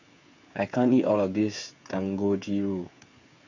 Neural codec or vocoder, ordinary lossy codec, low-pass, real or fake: codec, 16 kHz, 8 kbps, FreqCodec, smaller model; none; 7.2 kHz; fake